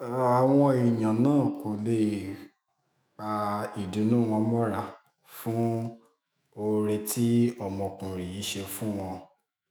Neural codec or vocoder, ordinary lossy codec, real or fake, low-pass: autoencoder, 48 kHz, 128 numbers a frame, DAC-VAE, trained on Japanese speech; none; fake; none